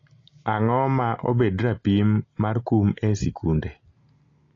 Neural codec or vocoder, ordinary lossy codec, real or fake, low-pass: none; AAC, 32 kbps; real; 7.2 kHz